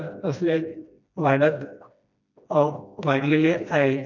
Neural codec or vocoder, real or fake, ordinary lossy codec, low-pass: codec, 16 kHz, 1 kbps, FreqCodec, smaller model; fake; none; 7.2 kHz